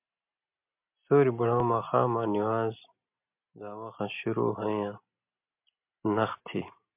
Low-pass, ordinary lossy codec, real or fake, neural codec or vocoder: 3.6 kHz; MP3, 32 kbps; real; none